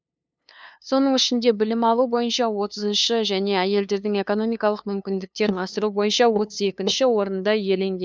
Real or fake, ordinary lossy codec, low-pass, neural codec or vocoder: fake; none; none; codec, 16 kHz, 2 kbps, FunCodec, trained on LibriTTS, 25 frames a second